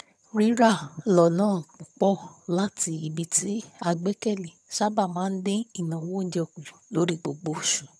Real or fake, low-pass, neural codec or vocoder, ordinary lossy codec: fake; none; vocoder, 22.05 kHz, 80 mel bands, HiFi-GAN; none